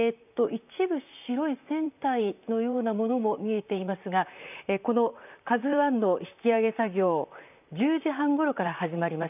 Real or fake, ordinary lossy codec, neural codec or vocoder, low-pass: fake; none; vocoder, 44.1 kHz, 128 mel bands, Pupu-Vocoder; 3.6 kHz